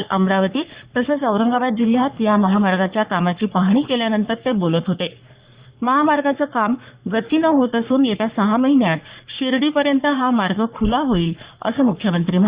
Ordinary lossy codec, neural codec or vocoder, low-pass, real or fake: Opus, 64 kbps; codec, 44.1 kHz, 3.4 kbps, Pupu-Codec; 3.6 kHz; fake